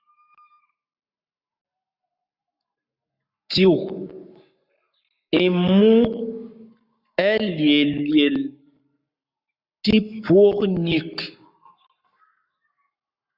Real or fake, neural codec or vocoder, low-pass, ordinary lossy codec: fake; codec, 44.1 kHz, 7.8 kbps, Pupu-Codec; 5.4 kHz; Opus, 64 kbps